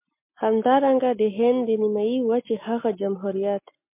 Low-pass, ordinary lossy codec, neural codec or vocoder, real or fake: 3.6 kHz; MP3, 24 kbps; none; real